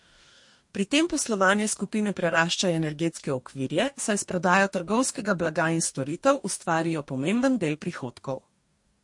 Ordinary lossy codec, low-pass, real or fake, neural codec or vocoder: MP3, 48 kbps; 10.8 kHz; fake; codec, 44.1 kHz, 2.6 kbps, DAC